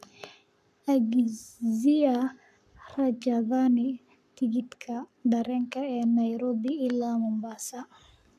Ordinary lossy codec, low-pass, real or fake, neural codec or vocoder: none; 14.4 kHz; fake; codec, 44.1 kHz, 7.8 kbps, Pupu-Codec